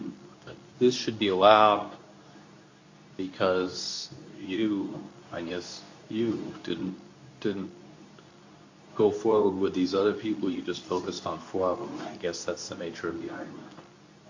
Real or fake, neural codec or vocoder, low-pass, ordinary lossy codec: fake; codec, 24 kHz, 0.9 kbps, WavTokenizer, medium speech release version 2; 7.2 kHz; AAC, 48 kbps